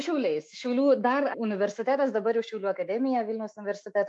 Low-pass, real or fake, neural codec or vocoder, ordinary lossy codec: 10.8 kHz; fake; autoencoder, 48 kHz, 128 numbers a frame, DAC-VAE, trained on Japanese speech; AAC, 64 kbps